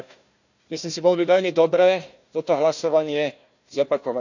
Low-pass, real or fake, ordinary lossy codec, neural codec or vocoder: 7.2 kHz; fake; none; codec, 16 kHz, 1 kbps, FunCodec, trained on Chinese and English, 50 frames a second